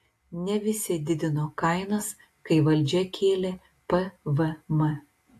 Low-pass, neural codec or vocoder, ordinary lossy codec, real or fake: 14.4 kHz; none; AAC, 64 kbps; real